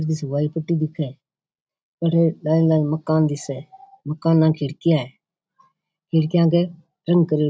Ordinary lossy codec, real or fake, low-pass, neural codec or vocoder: none; real; none; none